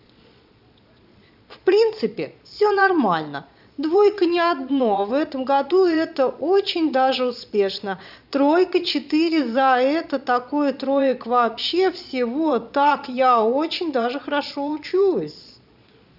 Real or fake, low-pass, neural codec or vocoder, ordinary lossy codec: fake; 5.4 kHz; vocoder, 22.05 kHz, 80 mel bands, Vocos; none